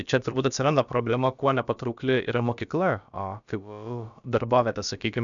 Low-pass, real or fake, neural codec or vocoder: 7.2 kHz; fake; codec, 16 kHz, about 1 kbps, DyCAST, with the encoder's durations